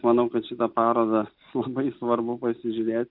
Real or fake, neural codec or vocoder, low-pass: real; none; 5.4 kHz